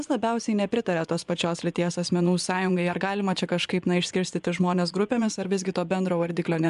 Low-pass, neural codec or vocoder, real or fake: 10.8 kHz; vocoder, 24 kHz, 100 mel bands, Vocos; fake